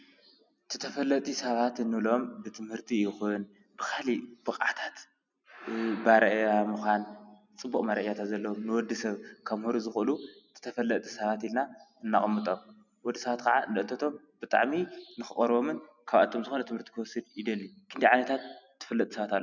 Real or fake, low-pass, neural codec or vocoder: real; 7.2 kHz; none